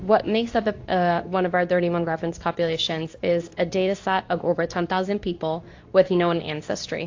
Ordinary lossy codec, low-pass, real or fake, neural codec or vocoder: AAC, 48 kbps; 7.2 kHz; fake; codec, 24 kHz, 0.9 kbps, WavTokenizer, medium speech release version 2